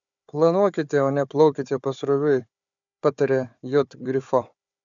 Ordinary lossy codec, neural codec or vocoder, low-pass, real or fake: MP3, 96 kbps; codec, 16 kHz, 4 kbps, FunCodec, trained on Chinese and English, 50 frames a second; 7.2 kHz; fake